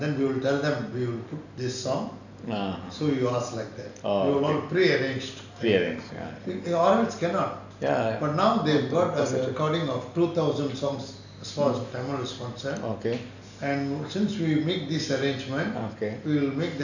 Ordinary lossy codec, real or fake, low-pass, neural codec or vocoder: none; real; 7.2 kHz; none